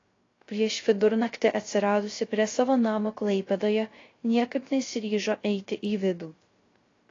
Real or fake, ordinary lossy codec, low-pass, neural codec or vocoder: fake; AAC, 32 kbps; 7.2 kHz; codec, 16 kHz, 0.3 kbps, FocalCodec